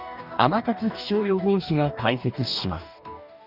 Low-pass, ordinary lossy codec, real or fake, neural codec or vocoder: 5.4 kHz; none; fake; codec, 44.1 kHz, 2.6 kbps, DAC